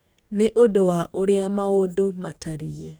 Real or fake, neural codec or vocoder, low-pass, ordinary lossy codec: fake; codec, 44.1 kHz, 2.6 kbps, DAC; none; none